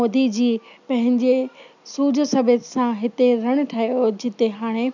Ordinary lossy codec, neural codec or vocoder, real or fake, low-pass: none; none; real; 7.2 kHz